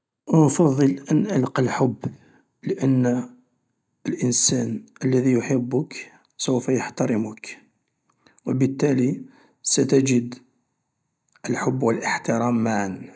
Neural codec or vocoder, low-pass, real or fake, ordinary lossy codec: none; none; real; none